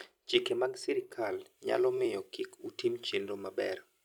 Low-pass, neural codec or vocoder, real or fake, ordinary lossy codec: 19.8 kHz; vocoder, 44.1 kHz, 128 mel bands every 256 samples, BigVGAN v2; fake; none